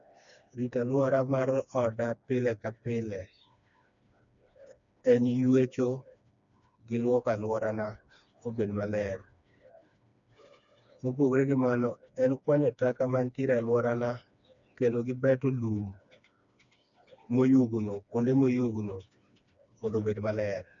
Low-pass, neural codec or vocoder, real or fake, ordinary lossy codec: 7.2 kHz; codec, 16 kHz, 2 kbps, FreqCodec, smaller model; fake; none